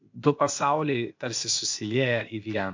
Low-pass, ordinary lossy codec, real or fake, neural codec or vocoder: 7.2 kHz; MP3, 48 kbps; fake; codec, 16 kHz, 0.8 kbps, ZipCodec